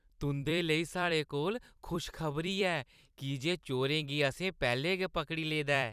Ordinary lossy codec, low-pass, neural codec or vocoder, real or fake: none; 14.4 kHz; vocoder, 44.1 kHz, 128 mel bands every 256 samples, BigVGAN v2; fake